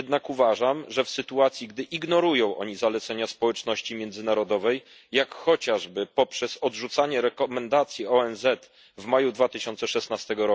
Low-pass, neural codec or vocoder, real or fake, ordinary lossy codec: none; none; real; none